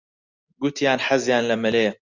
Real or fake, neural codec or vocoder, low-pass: real; none; 7.2 kHz